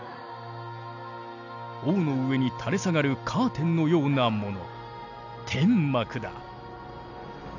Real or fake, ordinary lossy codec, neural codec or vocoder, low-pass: real; none; none; 7.2 kHz